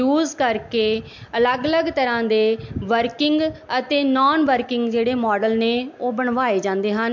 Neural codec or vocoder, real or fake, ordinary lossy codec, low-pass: none; real; MP3, 48 kbps; 7.2 kHz